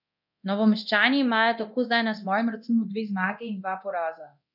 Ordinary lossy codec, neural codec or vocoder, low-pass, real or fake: none; codec, 24 kHz, 0.9 kbps, DualCodec; 5.4 kHz; fake